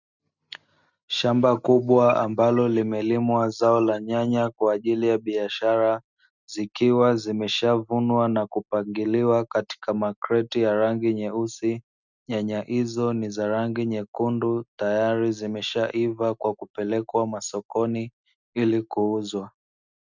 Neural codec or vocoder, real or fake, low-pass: none; real; 7.2 kHz